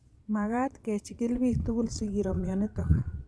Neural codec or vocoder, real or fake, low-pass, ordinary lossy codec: vocoder, 22.05 kHz, 80 mel bands, Vocos; fake; none; none